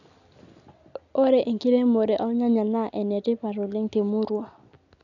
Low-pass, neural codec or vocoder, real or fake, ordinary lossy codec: 7.2 kHz; none; real; none